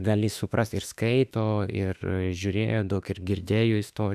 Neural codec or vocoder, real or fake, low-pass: autoencoder, 48 kHz, 32 numbers a frame, DAC-VAE, trained on Japanese speech; fake; 14.4 kHz